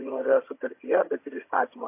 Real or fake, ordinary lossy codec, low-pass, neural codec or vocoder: fake; MP3, 24 kbps; 3.6 kHz; vocoder, 22.05 kHz, 80 mel bands, HiFi-GAN